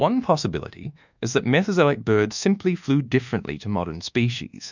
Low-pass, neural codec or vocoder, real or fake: 7.2 kHz; codec, 24 kHz, 1.2 kbps, DualCodec; fake